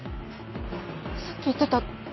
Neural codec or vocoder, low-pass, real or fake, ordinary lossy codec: none; 7.2 kHz; real; MP3, 24 kbps